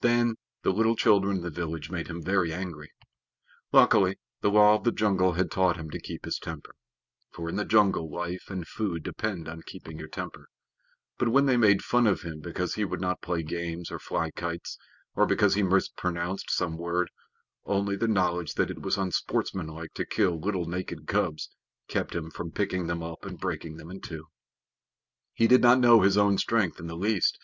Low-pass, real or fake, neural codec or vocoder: 7.2 kHz; real; none